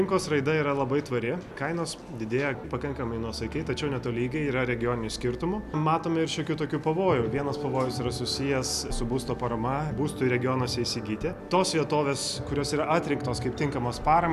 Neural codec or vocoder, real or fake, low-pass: none; real; 14.4 kHz